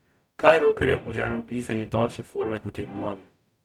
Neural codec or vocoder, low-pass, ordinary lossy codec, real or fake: codec, 44.1 kHz, 0.9 kbps, DAC; 19.8 kHz; none; fake